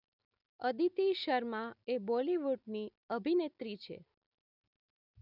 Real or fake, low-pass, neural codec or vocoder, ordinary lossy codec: real; 5.4 kHz; none; none